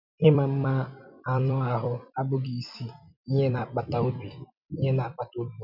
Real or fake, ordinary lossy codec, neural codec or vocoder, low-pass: fake; none; vocoder, 44.1 kHz, 128 mel bands every 256 samples, BigVGAN v2; 5.4 kHz